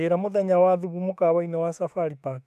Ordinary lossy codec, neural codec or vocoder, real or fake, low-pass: none; autoencoder, 48 kHz, 32 numbers a frame, DAC-VAE, trained on Japanese speech; fake; 14.4 kHz